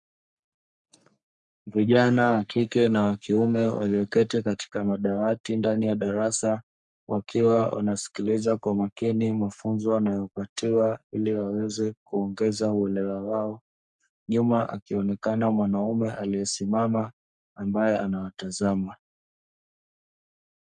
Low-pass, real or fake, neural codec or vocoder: 10.8 kHz; fake; codec, 44.1 kHz, 3.4 kbps, Pupu-Codec